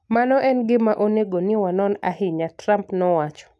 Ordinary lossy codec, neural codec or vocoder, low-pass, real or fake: none; none; none; real